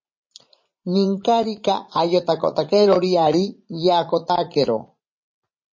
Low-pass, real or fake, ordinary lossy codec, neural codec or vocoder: 7.2 kHz; fake; MP3, 32 kbps; vocoder, 44.1 kHz, 80 mel bands, Vocos